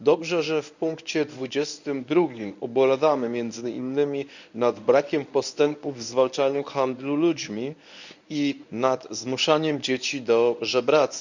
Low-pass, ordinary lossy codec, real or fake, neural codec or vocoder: 7.2 kHz; none; fake; codec, 24 kHz, 0.9 kbps, WavTokenizer, medium speech release version 1